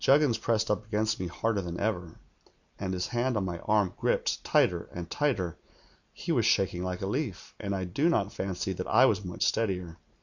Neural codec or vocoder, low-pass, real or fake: none; 7.2 kHz; real